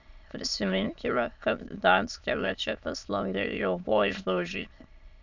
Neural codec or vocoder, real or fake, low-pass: autoencoder, 22.05 kHz, a latent of 192 numbers a frame, VITS, trained on many speakers; fake; 7.2 kHz